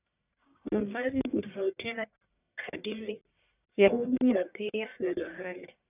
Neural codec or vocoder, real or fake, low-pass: codec, 44.1 kHz, 1.7 kbps, Pupu-Codec; fake; 3.6 kHz